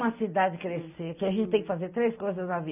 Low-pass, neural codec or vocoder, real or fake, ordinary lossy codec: 3.6 kHz; none; real; none